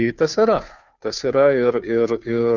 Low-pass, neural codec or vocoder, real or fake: 7.2 kHz; codec, 16 kHz, 2 kbps, FunCodec, trained on Chinese and English, 25 frames a second; fake